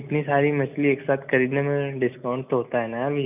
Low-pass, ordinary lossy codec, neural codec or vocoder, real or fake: 3.6 kHz; none; none; real